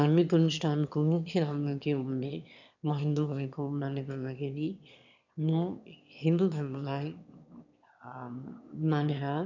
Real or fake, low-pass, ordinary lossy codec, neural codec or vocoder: fake; 7.2 kHz; none; autoencoder, 22.05 kHz, a latent of 192 numbers a frame, VITS, trained on one speaker